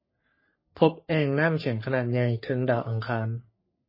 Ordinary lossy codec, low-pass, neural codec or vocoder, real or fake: MP3, 24 kbps; 5.4 kHz; codec, 44.1 kHz, 3.4 kbps, Pupu-Codec; fake